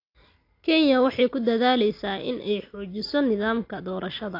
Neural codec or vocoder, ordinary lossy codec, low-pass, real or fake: none; AAC, 32 kbps; 5.4 kHz; real